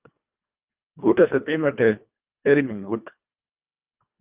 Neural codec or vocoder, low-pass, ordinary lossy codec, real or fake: codec, 24 kHz, 1.5 kbps, HILCodec; 3.6 kHz; Opus, 24 kbps; fake